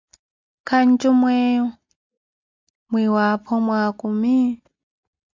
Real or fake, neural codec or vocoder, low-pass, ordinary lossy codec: real; none; 7.2 kHz; MP3, 64 kbps